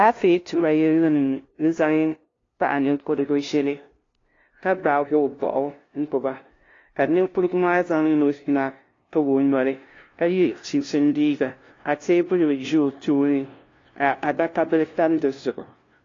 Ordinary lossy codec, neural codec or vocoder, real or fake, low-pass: AAC, 32 kbps; codec, 16 kHz, 0.5 kbps, FunCodec, trained on LibriTTS, 25 frames a second; fake; 7.2 kHz